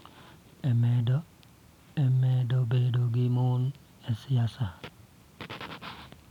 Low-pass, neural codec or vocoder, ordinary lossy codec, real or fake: 19.8 kHz; none; none; real